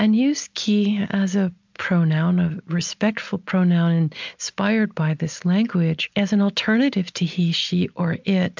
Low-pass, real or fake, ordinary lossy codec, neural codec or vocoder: 7.2 kHz; real; MP3, 64 kbps; none